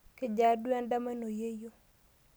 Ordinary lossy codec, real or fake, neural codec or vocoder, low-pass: none; real; none; none